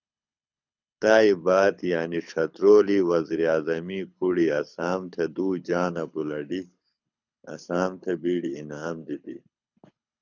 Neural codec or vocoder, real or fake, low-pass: codec, 24 kHz, 6 kbps, HILCodec; fake; 7.2 kHz